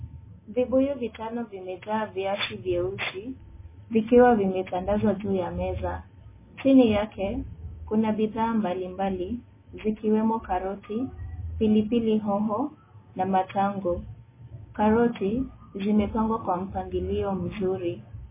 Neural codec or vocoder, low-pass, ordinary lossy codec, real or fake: none; 3.6 kHz; MP3, 16 kbps; real